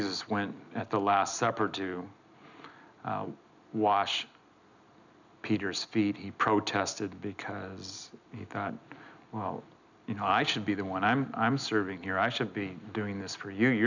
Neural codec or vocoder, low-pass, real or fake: none; 7.2 kHz; real